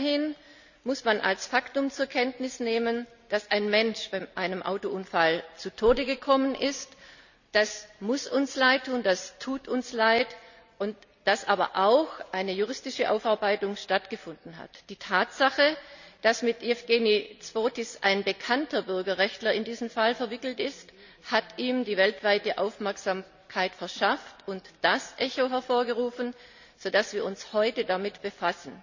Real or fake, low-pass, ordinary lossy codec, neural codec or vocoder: real; 7.2 kHz; none; none